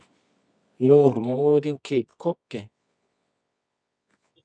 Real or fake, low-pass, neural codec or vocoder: fake; 9.9 kHz; codec, 24 kHz, 0.9 kbps, WavTokenizer, medium music audio release